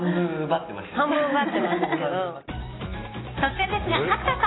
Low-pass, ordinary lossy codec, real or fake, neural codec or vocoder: 7.2 kHz; AAC, 16 kbps; real; none